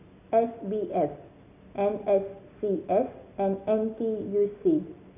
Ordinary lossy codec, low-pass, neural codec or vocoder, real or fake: none; 3.6 kHz; none; real